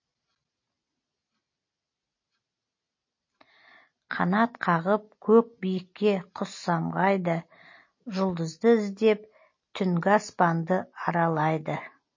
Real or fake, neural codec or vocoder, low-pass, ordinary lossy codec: real; none; 7.2 kHz; MP3, 32 kbps